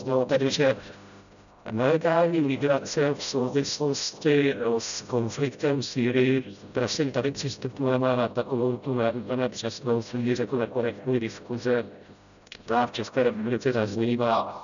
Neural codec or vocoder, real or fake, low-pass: codec, 16 kHz, 0.5 kbps, FreqCodec, smaller model; fake; 7.2 kHz